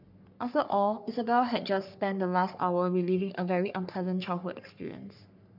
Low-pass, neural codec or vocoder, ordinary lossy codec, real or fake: 5.4 kHz; codec, 44.1 kHz, 3.4 kbps, Pupu-Codec; none; fake